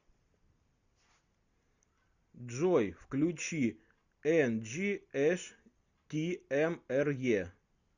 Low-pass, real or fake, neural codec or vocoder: 7.2 kHz; real; none